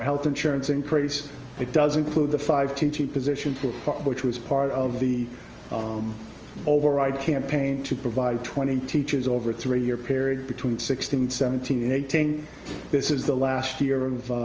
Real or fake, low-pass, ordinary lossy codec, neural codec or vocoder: real; 7.2 kHz; Opus, 24 kbps; none